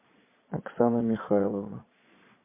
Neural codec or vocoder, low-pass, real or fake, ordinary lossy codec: vocoder, 22.05 kHz, 80 mel bands, Vocos; 3.6 kHz; fake; MP3, 24 kbps